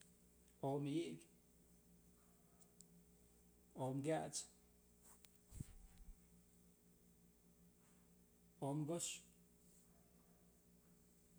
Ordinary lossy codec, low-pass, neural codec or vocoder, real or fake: none; none; none; real